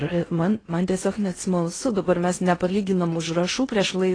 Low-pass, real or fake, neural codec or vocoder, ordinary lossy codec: 9.9 kHz; fake; codec, 16 kHz in and 24 kHz out, 0.6 kbps, FocalCodec, streaming, 4096 codes; AAC, 32 kbps